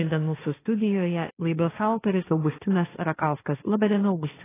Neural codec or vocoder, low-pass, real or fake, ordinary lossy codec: codec, 16 kHz, 1.1 kbps, Voila-Tokenizer; 3.6 kHz; fake; AAC, 16 kbps